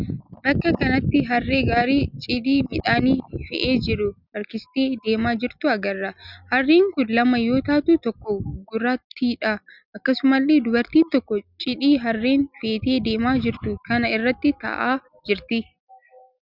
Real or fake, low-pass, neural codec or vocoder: real; 5.4 kHz; none